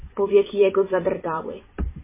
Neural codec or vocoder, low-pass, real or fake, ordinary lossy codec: vocoder, 44.1 kHz, 128 mel bands every 256 samples, BigVGAN v2; 3.6 kHz; fake; MP3, 16 kbps